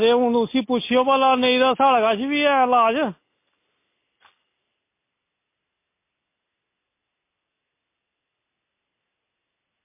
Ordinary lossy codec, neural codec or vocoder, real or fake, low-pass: MP3, 24 kbps; none; real; 3.6 kHz